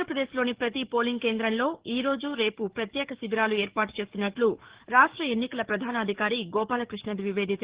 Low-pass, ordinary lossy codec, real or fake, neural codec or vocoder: 3.6 kHz; Opus, 16 kbps; fake; codec, 44.1 kHz, 7.8 kbps, DAC